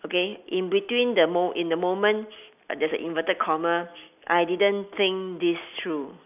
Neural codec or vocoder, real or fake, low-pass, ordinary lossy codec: none; real; 3.6 kHz; none